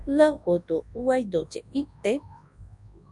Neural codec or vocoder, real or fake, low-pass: codec, 24 kHz, 0.9 kbps, WavTokenizer, large speech release; fake; 10.8 kHz